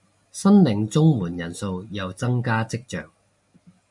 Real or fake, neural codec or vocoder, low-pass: real; none; 10.8 kHz